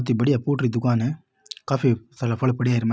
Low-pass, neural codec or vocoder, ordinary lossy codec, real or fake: none; none; none; real